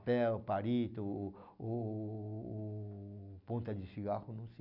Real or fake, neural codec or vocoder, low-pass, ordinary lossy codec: real; none; 5.4 kHz; none